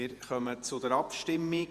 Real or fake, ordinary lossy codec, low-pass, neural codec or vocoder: fake; Opus, 64 kbps; 14.4 kHz; vocoder, 48 kHz, 128 mel bands, Vocos